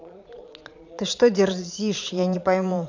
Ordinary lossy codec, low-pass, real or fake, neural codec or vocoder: MP3, 64 kbps; 7.2 kHz; fake; vocoder, 22.05 kHz, 80 mel bands, Vocos